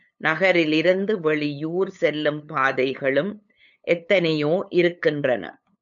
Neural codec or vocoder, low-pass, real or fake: codec, 16 kHz, 8 kbps, FunCodec, trained on LibriTTS, 25 frames a second; 7.2 kHz; fake